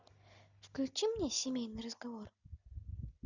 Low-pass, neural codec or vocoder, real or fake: 7.2 kHz; none; real